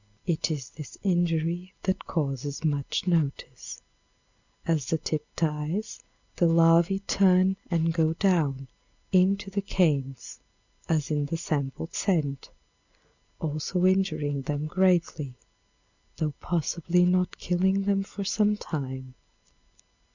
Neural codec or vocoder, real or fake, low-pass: none; real; 7.2 kHz